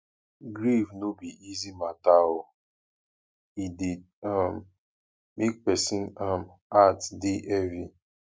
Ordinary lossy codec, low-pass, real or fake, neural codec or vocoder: none; none; real; none